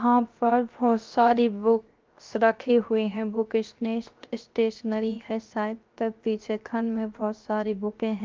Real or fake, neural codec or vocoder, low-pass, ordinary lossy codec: fake; codec, 16 kHz, 0.7 kbps, FocalCodec; 7.2 kHz; Opus, 32 kbps